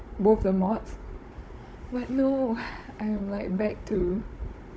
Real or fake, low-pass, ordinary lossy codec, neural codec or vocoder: fake; none; none; codec, 16 kHz, 16 kbps, FunCodec, trained on Chinese and English, 50 frames a second